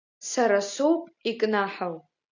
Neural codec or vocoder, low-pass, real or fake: none; 7.2 kHz; real